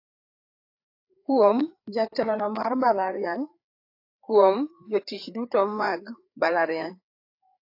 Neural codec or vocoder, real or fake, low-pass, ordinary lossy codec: codec, 16 kHz, 4 kbps, FreqCodec, larger model; fake; 5.4 kHz; AAC, 32 kbps